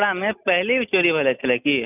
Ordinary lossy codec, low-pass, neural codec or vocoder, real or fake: none; 3.6 kHz; none; real